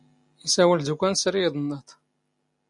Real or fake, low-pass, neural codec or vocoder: real; 10.8 kHz; none